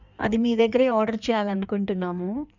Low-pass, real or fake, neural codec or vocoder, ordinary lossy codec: 7.2 kHz; fake; codec, 16 kHz in and 24 kHz out, 1.1 kbps, FireRedTTS-2 codec; none